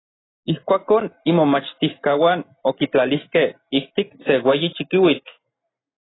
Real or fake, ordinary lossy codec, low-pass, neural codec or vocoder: real; AAC, 16 kbps; 7.2 kHz; none